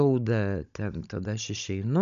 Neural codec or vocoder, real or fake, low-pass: codec, 16 kHz, 16 kbps, FunCodec, trained on Chinese and English, 50 frames a second; fake; 7.2 kHz